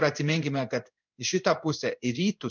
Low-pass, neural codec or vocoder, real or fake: 7.2 kHz; codec, 16 kHz in and 24 kHz out, 1 kbps, XY-Tokenizer; fake